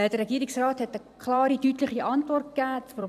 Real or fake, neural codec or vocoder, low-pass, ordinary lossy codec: fake; vocoder, 44.1 kHz, 128 mel bands every 256 samples, BigVGAN v2; 14.4 kHz; none